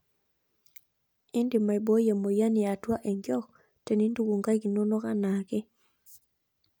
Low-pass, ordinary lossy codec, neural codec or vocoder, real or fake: none; none; none; real